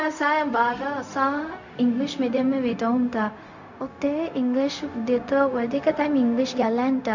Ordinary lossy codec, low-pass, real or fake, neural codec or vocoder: none; 7.2 kHz; fake; codec, 16 kHz, 0.4 kbps, LongCat-Audio-Codec